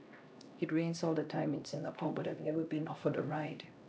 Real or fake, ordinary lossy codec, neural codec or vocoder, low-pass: fake; none; codec, 16 kHz, 1 kbps, X-Codec, HuBERT features, trained on LibriSpeech; none